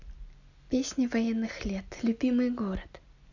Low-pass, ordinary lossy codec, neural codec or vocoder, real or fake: 7.2 kHz; none; none; real